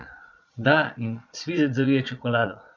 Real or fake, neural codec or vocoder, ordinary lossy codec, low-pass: fake; vocoder, 22.05 kHz, 80 mel bands, Vocos; none; 7.2 kHz